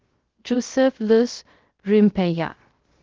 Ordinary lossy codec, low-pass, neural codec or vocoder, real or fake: Opus, 16 kbps; 7.2 kHz; codec, 16 kHz, 0.3 kbps, FocalCodec; fake